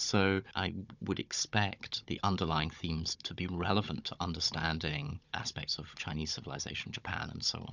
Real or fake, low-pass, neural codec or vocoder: fake; 7.2 kHz; codec, 16 kHz, 16 kbps, FunCodec, trained on Chinese and English, 50 frames a second